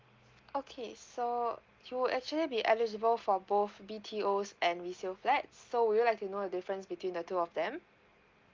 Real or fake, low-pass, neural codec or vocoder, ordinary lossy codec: real; 7.2 kHz; none; Opus, 32 kbps